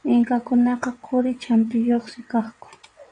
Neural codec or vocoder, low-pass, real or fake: vocoder, 22.05 kHz, 80 mel bands, WaveNeXt; 9.9 kHz; fake